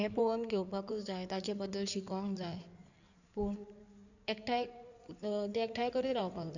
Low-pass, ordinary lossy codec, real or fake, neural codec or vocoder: 7.2 kHz; none; fake; codec, 16 kHz in and 24 kHz out, 2.2 kbps, FireRedTTS-2 codec